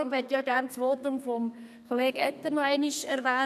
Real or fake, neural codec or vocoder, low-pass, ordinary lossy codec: fake; codec, 32 kHz, 1.9 kbps, SNAC; 14.4 kHz; none